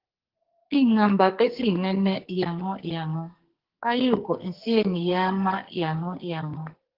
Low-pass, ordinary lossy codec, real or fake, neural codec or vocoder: 5.4 kHz; Opus, 16 kbps; fake; codec, 44.1 kHz, 2.6 kbps, SNAC